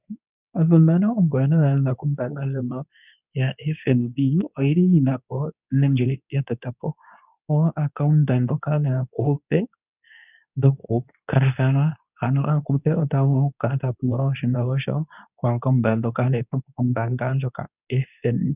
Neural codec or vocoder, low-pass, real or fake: codec, 24 kHz, 0.9 kbps, WavTokenizer, medium speech release version 2; 3.6 kHz; fake